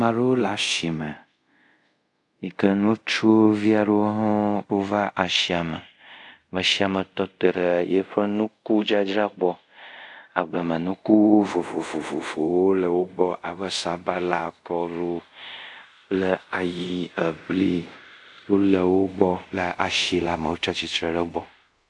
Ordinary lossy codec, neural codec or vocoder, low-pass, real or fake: AAC, 64 kbps; codec, 24 kHz, 0.5 kbps, DualCodec; 10.8 kHz; fake